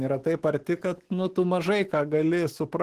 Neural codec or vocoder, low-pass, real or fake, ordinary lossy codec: autoencoder, 48 kHz, 128 numbers a frame, DAC-VAE, trained on Japanese speech; 14.4 kHz; fake; Opus, 16 kbps